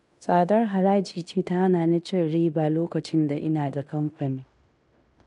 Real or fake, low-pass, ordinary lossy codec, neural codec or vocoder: fake; 10.8 kHz; none; codec, 16 kHz in and 24 kHz out, 0.9 kbps, LongCat-Audio-Codec, fine tuned four codebook decoder